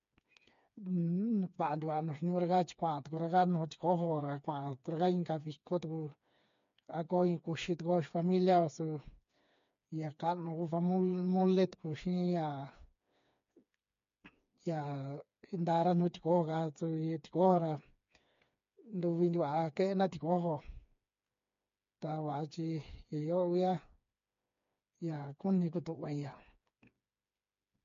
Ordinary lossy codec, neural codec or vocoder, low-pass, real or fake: MP3, 48 kbps; codec, 16 kHz, 4 kbps, FreqCodec, smaller model; 7.2 kHz; fake